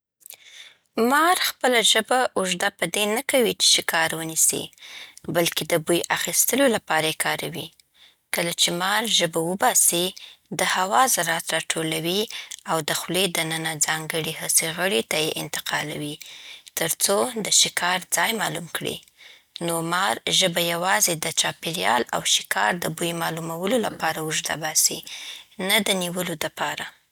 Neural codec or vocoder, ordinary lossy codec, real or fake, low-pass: none; none; real; none